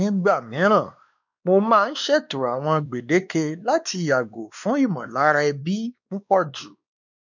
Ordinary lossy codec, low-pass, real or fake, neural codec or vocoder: none; 7.2 kHz; fake; codec, 16 kHz, 2 kbps, X-Codec, WavLM features, trained on Multilingual LibriSpeech